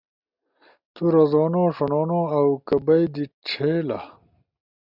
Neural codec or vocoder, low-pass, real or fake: none; 5.4 kHz; real